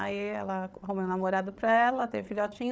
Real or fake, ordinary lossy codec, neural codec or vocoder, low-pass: fake; none; codec, 16 kHz, 8 kbps, FreqCodec, larger model; none